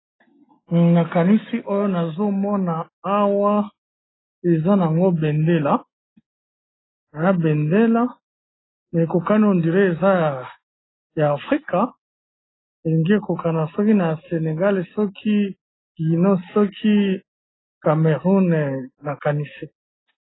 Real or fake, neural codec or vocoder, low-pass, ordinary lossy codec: real; none; 7.2 kHz; AAC, 16 kbps